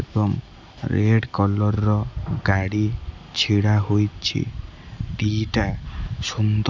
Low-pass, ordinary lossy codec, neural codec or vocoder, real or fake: none; none; none; real